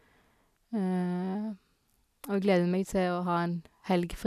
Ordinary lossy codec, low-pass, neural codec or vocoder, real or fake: none; 14.4 kHz; none; real